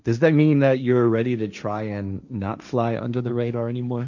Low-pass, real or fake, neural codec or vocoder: 7.2 kHz; fake; codec, 16 kHz, 1.1 kbps, Voila-Tokenizer